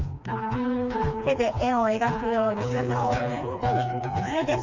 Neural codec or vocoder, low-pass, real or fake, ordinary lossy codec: codec, 16 kHz, 2 kbps, FreqCodec, smaller model; 7.2 kHz; fake; none